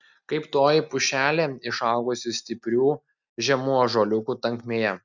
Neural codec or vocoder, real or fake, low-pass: none; real; 7.2 kHz